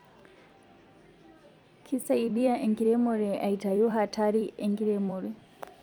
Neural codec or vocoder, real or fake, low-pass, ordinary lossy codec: vocoder, 44.1 kHz, 128 mel bands every 256 samples, BigVGAN v2; fake; 19.8 kHz; none